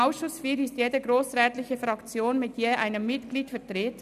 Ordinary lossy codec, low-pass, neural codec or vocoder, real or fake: none; 14.4 kHz; none; real